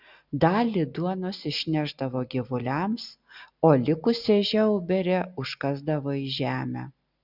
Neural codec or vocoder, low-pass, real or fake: none; 5.4 kHz; real